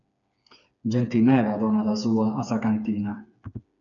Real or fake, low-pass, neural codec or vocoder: fake; 7.2 kHz; codec, 16 kHz, 4 kbps, FreqCodec, smaller model